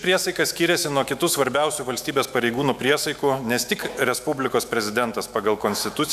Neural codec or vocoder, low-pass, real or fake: autoencoder, 48 kHz, 128 numbers a frame, DAC-VAE, trained on Japanese speech; 14.4 kHz; fake